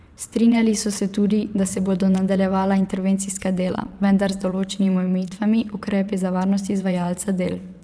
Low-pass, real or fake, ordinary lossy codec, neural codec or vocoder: none; fake; none; vocoder, 22.05 kHz, 80 mel bands, WaveNeXt